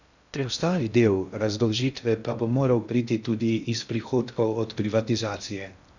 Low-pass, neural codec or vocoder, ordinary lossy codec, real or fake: 7.2 kHz; codec, 16 kHz in and 24 kHz out, 0.6 kbps, FocalCodec, streaming, 2048 codes; none; fake